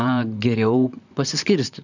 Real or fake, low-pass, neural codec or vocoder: fake; 7.2 kHz; vocoder, 44.1 kHz, 128 mel bands, Pupu-Vocoder